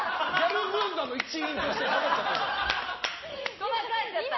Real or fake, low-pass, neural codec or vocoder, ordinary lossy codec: real; 7.2 kHz; none; MP3, 24 kbps